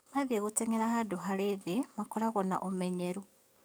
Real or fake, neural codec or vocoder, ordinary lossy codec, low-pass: fake; codec, 44.1 kHz, 7.8 kbps, DAC; none; none